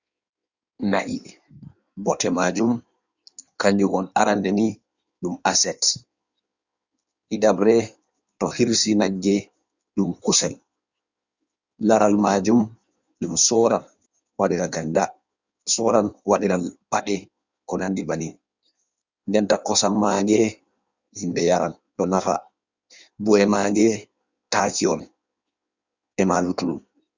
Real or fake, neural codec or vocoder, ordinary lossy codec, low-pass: fake; codec, 16 kHz in and 24 kHz out, 1.1 kbps, FireRedTTS-2 codec; Opus, 64 kbps; 7.2 kHz